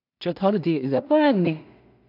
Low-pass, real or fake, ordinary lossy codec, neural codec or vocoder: 5.4 kHz; fake; none; codec, 16 kHz in and 24 kHz out, 0.4 kbps, LongCat-Audio-Codec, two codebook decoder